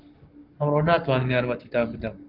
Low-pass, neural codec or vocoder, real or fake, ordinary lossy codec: 5.4 kHz; codec, 44.1 kHz, 7.8 kbps, Pupu-Codec; fake; Opus, 32 kbps